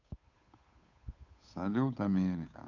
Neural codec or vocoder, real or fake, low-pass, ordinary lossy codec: codec, 16 kHz, 8 kbps, FunCodec, trained on Chinese and English, 25 frames a second; fake; 7.2 kHz; none